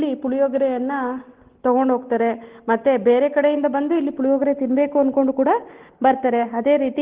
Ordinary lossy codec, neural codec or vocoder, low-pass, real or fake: Opus, 16 kbps; none; 3.6 kHz; real